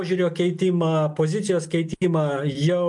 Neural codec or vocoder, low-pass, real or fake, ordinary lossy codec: none; 10.8 kHz; real; MP3, 64 kbps